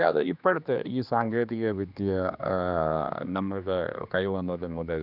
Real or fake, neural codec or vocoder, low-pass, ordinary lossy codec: fake; codec, 16 kHz, 2 kbps, X-Codec, HuBERT features, trained on general audio; 5.4 kHz; none